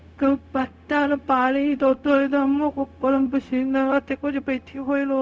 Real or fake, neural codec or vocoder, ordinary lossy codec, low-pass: fake; codec, 16 kHz, 0.4 kbps, LongCat-Audio-Codec; none; none